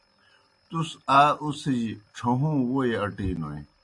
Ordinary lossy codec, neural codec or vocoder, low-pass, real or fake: AAC, 48 kbps; none; 10.8 kHz; real